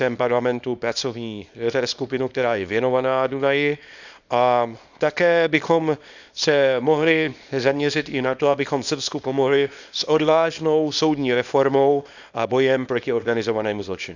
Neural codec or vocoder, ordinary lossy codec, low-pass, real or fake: codec, 24 kHz, 0.9 kbps, WavTokenizer, small release; none; 7.2 kHz; fake